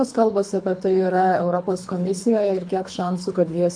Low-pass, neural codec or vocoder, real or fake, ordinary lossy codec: 9.9 kHz; codec, 24 kHz, 3 kbps, HILCodec; fake; MP3, 64 kbps